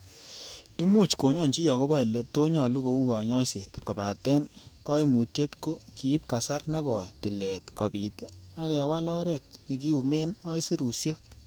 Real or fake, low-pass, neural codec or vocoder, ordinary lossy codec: fake; none; codec, 44.1 kHz, 2.6 kbps, DAC; none